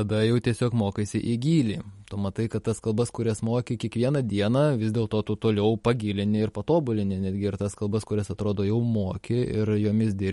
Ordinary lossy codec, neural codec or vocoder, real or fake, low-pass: MP3, 48 kbps; none; real; 19.8 kHz